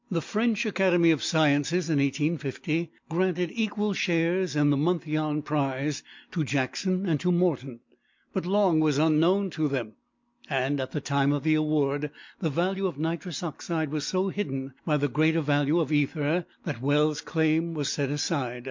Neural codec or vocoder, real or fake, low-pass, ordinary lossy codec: none; real; 7.2 kHz; MP3, 48 kbps